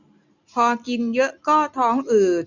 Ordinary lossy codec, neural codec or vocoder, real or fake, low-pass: none; none; real; 7.2 kHz